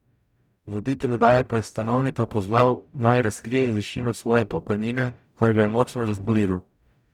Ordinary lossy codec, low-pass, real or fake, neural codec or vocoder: none; 19.8 kHz; fake; codec, 44.1 kHz, 0.9 kbps, DAC